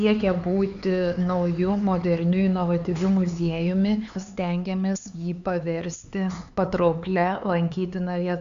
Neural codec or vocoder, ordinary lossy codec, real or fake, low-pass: codec, 16 kHz, 4 kbps, X-Codec, HuBERT features, trained on LibriSpeech; AAC, 64 kbps; fake; 7.2 kHz